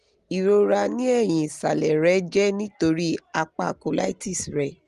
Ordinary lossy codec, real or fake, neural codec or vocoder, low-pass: Opus, 24 kbps; real; none; 10.8 kHz